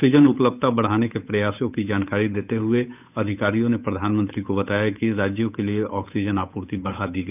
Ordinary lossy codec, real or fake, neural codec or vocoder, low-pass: none; fake; codec, 16 kHz, 8 kbps, FunCodec, trained on Chinese and English, 25 frames a second; 3.6 kHz